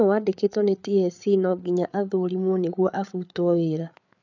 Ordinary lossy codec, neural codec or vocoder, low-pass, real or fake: none; codec, 16 kHz, 8 kbps, FreqCodec, larger model; 7.2 kHz; fake